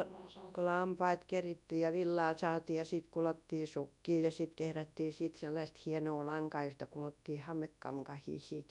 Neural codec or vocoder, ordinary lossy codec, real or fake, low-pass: codec, 24 kHz, 0.9 kbps, WavTokenizer, large speech release; none; fake; 10.8 kHz